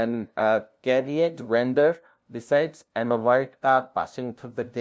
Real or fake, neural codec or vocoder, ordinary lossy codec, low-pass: fake; codec, 16 kHz, 0.5 kbps, FunCodec, trained on LibriTTS, 25 frames a second; none; none